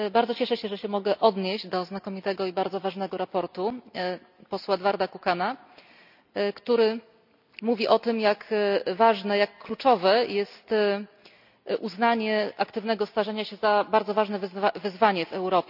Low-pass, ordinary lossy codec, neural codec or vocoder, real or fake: 5.4 kHz; none; none; real